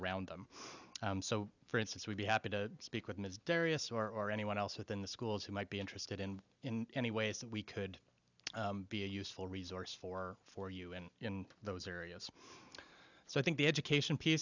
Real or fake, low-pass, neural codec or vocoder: real; 7.2 kHz; none